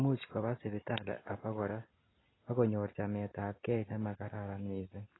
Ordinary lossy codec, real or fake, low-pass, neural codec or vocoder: AAC, 16 kbps; real; 7.2 kHz; none